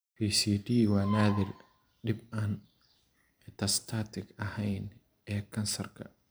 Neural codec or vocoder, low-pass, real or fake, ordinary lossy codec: vocoder, 44.1 kHz, 128 mel bands every 512 samples, BigVGAN v2; none; fake; none